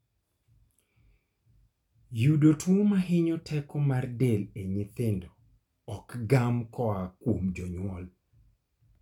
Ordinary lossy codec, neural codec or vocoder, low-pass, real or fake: none; none; 19.8 kHz; real